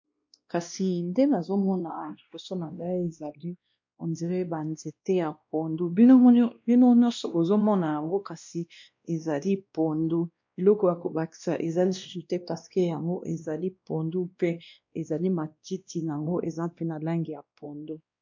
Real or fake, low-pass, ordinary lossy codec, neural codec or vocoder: fake; 7.2 kHz; MP3, 48 kbps; codec, 16 kHz, 1 kbps, X-Codec, WavLM features, trained on Multilingual LibriSpeech